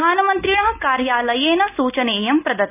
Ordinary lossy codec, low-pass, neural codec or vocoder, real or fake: none; 3.6 kHz; none; real